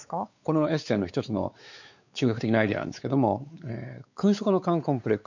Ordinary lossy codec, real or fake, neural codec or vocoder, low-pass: none; fake; codec, 16 kHz, 4 kbps, X-Codec, WavLM features, trained on Multilingual LibriSpeech; 7.2 kHz